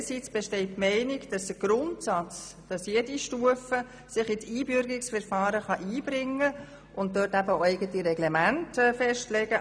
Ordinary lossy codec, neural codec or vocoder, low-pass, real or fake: none; none; 9.9 kHz; real